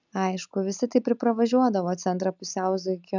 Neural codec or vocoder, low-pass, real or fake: none; 7.2 kHz; real